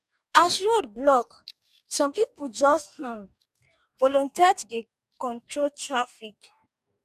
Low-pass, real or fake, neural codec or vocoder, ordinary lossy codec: 14.4 kHz; fake; codec, 44.1 kHz, 2.6 kbps, DAC; none